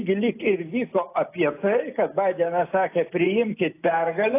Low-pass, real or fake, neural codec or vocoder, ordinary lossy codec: 3.6 kHz; real; none; AAC, 24 kbps